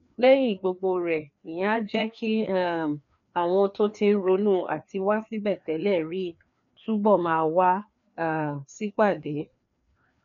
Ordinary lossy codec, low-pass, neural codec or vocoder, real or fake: none; 7.2 kHz; codec, 16 kHz, 2 kbps, FreqCodec, larger model; fake